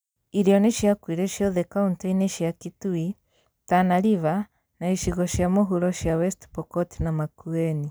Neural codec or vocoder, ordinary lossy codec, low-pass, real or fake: none; none; none; real